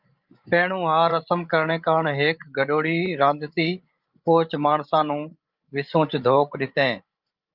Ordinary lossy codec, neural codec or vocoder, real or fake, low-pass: Opus, 24 kbps; codec, 16 kHz, 16 kbps, FreqCodec, larger model; fake; 5.4 kHz